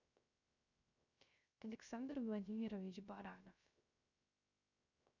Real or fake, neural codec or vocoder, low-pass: fake; codec, 16 kHz, 0.3 kbps, FocalCodec; 7.2 kHz